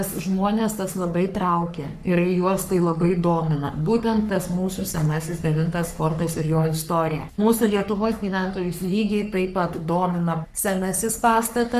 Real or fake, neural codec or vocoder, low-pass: fake; codec, 44.1 kHz, 3.4 kbps, Pupu-Codec; 14.4 kHz